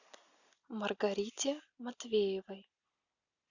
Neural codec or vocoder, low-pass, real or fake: none; 7.2 kHz; real